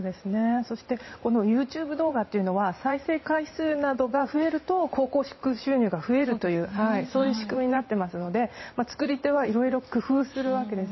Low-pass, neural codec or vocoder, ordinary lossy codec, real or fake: 7.2 kHz; vocoder, 44.1 kHz, 128 mel bands every 512 samples, BigVGAN v2; MP3, 24 kbps; fake